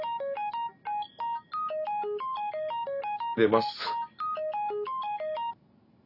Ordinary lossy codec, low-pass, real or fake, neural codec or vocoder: none; 5.4 kHz; real; none